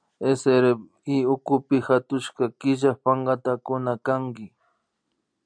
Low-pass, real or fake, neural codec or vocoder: 9.9 kHz; real; none